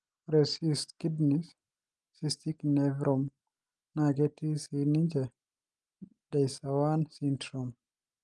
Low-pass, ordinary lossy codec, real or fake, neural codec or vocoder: 10.8 kHz; Opus, 24 kbps; real; none